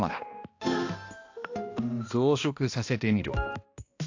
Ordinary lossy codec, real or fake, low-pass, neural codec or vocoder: AAC, 48 kbps; fake; 7.2 kHz; codec, 16 kHz, 1 kbps, X-Codec, HuBERT features, trained on balanced general audio